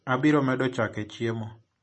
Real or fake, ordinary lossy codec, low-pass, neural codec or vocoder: real; MP3, 32 kbps; 10.8 kHz; none